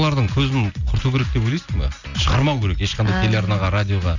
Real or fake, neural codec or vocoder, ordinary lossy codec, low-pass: real; none; none; 7.2 kHz